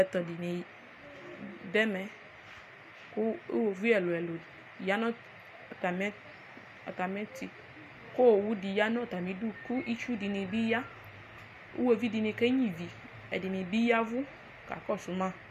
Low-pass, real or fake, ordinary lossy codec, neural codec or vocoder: 14.4 kHz; real; MP3, 64 kbps; none